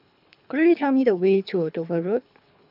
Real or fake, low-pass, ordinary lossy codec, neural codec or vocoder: fake; 5.4 kHz; AAC, 48 kbps; codec, 24 kHz, 3 kbps, HILCodec